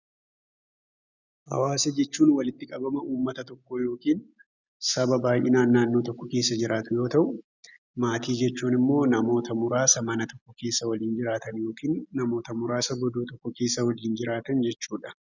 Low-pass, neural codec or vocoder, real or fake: 7.2 kHz; none; real